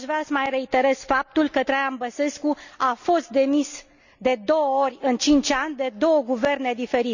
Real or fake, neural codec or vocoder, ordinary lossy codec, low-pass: real; none; none; 7.2 kHz